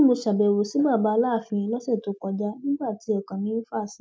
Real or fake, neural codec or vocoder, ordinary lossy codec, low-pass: real; none; none; none